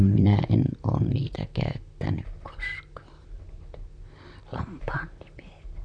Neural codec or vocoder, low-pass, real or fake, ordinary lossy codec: vocoder, 44.1 kHz, 128 mel bands, Pupu-Vocoder; 9.9 kHz; fake; none